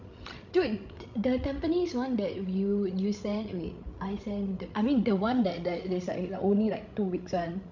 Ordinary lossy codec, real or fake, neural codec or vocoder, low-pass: none; fake; codec, 16 kHz, 16 kbps, FreqCodec, larger model; 7.2 kHz